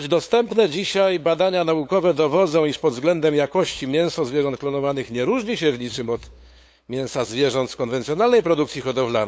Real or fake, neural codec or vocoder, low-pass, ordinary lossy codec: fake; codec, 16 kHz, 8 kbps, FunCodec, trained on LibriTTS, 25 frames a second; none; none